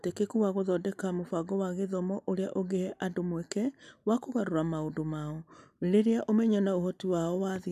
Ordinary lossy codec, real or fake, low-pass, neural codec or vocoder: none; real; 14.4 kHz; none